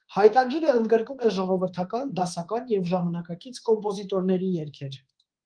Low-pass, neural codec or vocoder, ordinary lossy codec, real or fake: 9.9 kHz; codec, 24 kHz, 1.2 kbps, DualCodec; Opus, 24 kbps; fake